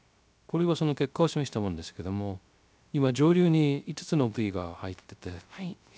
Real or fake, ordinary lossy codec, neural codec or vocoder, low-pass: fake; none; codec, 16 kHz, 0.3 kbps, FocalCodec; none